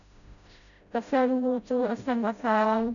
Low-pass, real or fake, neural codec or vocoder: 7.2 kHz; fake; codec, 16 kHz, 0.5 kbps, FreqCodec, smaller model